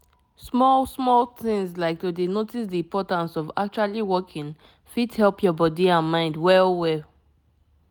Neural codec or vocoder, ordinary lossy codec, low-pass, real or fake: none; none; none; real